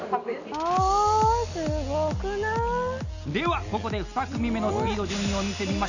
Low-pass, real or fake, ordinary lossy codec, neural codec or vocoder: 7.2 kHz; real; none; none